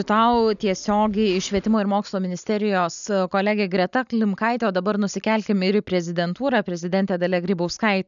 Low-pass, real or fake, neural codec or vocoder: 7.2 kHz; real; none